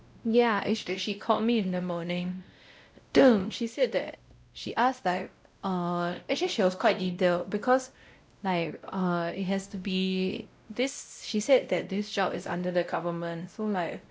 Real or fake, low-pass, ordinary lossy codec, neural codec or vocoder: fake; none; none; codec, 16 kHz, 0.5 kbps, X-Codec, WavLM features, trained on Multilingual LibriSpeech